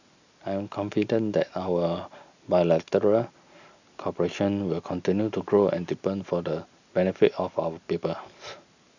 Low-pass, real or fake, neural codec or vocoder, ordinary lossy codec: 7.2 kHz; real; none; none